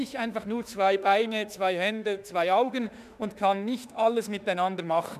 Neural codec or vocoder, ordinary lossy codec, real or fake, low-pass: autoencoder, 48 kHz, 32 numbers a frame, DAC-VAE, trained on Japanese speech; none; fake; 14.4 kHz